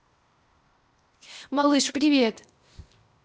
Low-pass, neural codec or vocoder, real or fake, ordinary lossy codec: none; codec, 16 kHz, 0.8 kbps, ZipCodec; fake; none